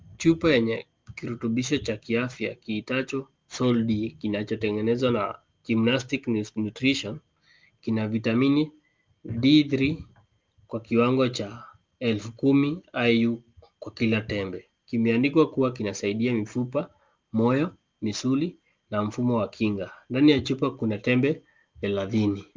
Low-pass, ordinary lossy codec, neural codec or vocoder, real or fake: 7.2 kHz; Opus, 24 kbps; none; real